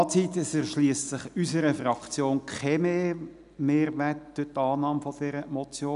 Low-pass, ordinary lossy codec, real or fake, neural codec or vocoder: 10.8 kHz; none; real; none